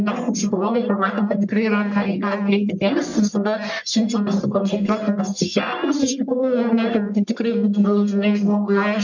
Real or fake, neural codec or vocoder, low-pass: fake; codec, 44.1 kHz, 1.7 kbps, Pupu-Codec; 7.2 kHz